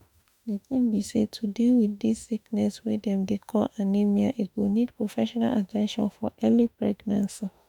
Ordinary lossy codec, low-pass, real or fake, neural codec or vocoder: none; 19.8 kHz; fake; autoencoder, 48 kHz, 32 numbers a frame, DAC-VAE, trained on Japanese speech